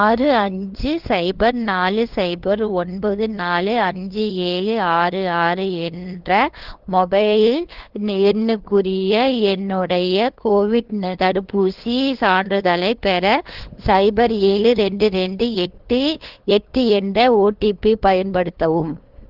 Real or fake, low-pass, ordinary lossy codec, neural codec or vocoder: fake; 5.4 kHz; Opus, 16 kbps; codec, 16 kHz, 2 kbps, FunCodec, trained on LibriTTS, 25 frames a second